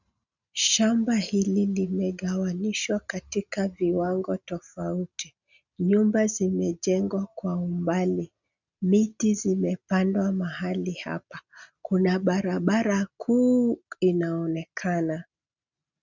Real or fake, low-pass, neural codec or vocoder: real; 7.2 kHz; none